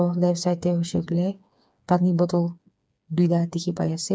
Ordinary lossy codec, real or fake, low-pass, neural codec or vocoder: none; fake; none; codec, 16 kHz, 4 kbps, FreqCodec, smaller model